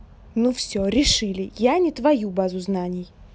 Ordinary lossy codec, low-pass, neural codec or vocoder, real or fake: none; none; none; real